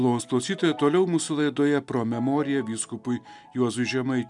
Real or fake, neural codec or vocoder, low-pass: real; none; 10.8 kHz